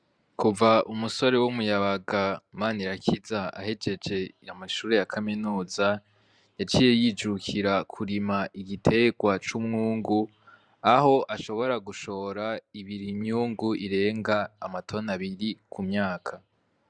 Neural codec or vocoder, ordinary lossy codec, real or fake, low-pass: vocoder, 44.1 kHz, 128 mel bands every 512 samples, BigVGAN v2; Opus, 64 kbps; fake; 9.9 kHz